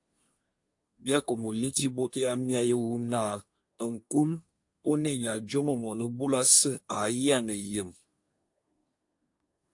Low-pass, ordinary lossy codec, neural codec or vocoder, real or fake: 10.8 kHz; AAC, 64 kbps; codec, 24 kHz, 1 kbps, SNAC; fake